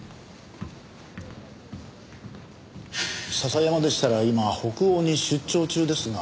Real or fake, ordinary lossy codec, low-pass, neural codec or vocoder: real; none; none; none